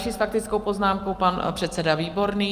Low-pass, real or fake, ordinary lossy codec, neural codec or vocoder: 14.4 kHz; real; Opus, 32 kbps; none